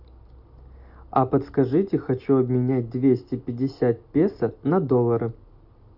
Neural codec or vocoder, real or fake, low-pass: none; real; 5.4 kHz